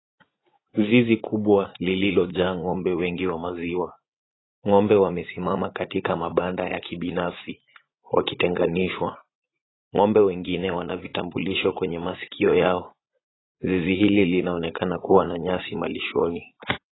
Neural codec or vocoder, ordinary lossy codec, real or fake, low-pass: vocoder, 44.1 kHz, 80 mel bands, Vocos; AAC, 16 kbps; fake; 7.2 kHz